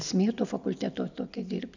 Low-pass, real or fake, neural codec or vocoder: 7.2 kHz; real; none